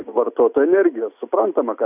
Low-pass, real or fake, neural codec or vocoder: 3.6 kHz; real; none